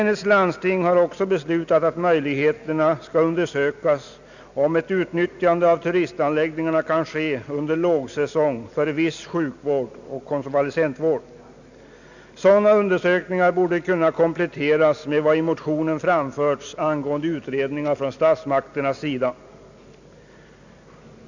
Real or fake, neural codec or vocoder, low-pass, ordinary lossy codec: real; none; 7.2 kHz; none